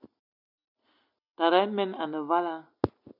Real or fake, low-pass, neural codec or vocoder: real; 5.4 kHz; none